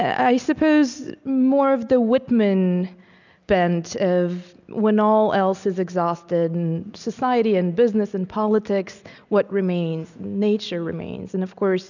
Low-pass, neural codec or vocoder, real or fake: 7.2 kHz; none; real